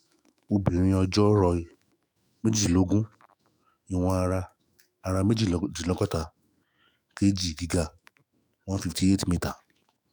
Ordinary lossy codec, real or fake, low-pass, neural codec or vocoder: none; fake; none; autoencoder, 48 kHz, 128 numbers a frame, DAC-VAE, trained on Japanese speech